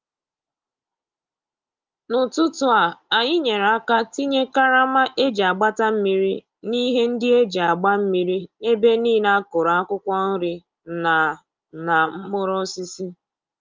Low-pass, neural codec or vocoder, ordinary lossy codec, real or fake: 7.2 kHz; none; Opus, 24 kbps; real